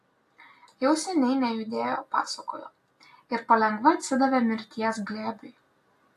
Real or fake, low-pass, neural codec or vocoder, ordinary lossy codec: real; 14.4 kHz; none; AAC, 64 kbps